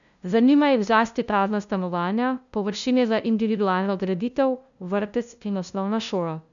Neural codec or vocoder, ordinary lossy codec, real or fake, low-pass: codec, 16 kHz, 0.5 kbps, FunCodec, trained on LibriTTS, 25 frames a second; none; fake; 7.2 kHz